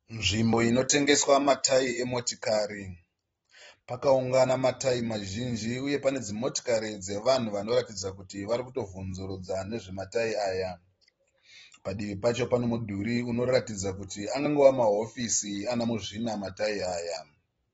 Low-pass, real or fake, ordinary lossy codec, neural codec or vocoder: 19.8 kHz; real; AAC, 24 kbps; none